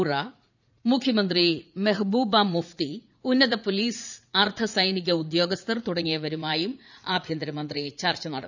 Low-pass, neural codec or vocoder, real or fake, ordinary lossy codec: 7.2 kHz; vocoder, 44.1 kHz, 80 mel bands, Vocos; fake; none